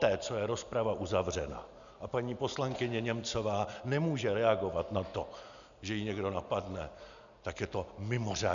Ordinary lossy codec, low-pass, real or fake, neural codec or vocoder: MP3, 96 kbps; 7.2 kHz; real; none